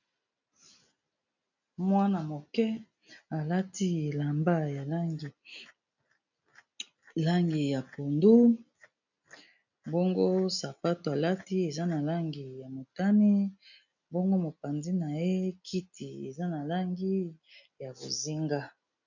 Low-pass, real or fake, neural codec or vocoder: 7.2 kHz; real; none